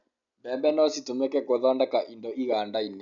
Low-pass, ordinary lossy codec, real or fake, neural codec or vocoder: 7.2 kHz; none; real; none